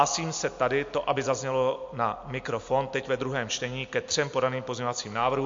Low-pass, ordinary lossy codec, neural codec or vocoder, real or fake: 7.2 kHz; MP3, 48 kbps; none; real